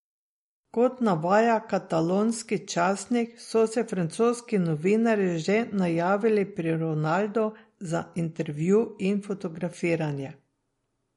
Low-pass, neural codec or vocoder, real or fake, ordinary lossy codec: 19.8 kHz; none; real; MP3, 48 kbps